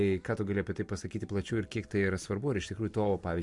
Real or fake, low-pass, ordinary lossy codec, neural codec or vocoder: real; 10.8 kHz; MP3, 64 kbps; none